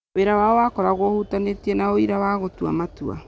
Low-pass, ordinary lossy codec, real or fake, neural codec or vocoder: none; none; real; none